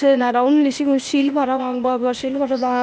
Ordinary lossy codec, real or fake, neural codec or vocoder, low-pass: none; fake; codec, 16 kHz, 0.8 kbps, ZipCodec; none